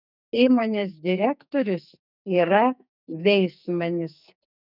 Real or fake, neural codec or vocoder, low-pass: fake; codec, 44.1 kHz, 2.6 kbps, SNAC; 5.4 kHz